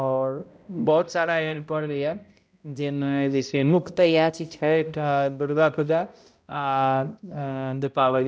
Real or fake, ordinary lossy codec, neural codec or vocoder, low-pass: fake; none; codec, 16 kHz, 0.5 kbps, X-Codec, HuBERT features, trained on balanced general audio; none